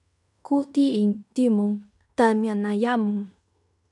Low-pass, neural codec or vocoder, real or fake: 10.8 kHz; codec, 16 kHz in and 24 kHz out, 0.9 kbps, LongCat-Audio-Codec, fine tuned four codebook decoder; fake